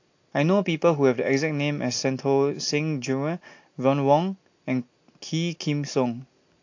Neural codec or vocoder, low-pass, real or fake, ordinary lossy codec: none; 7.2 kHz; real; AAC, 48 kbps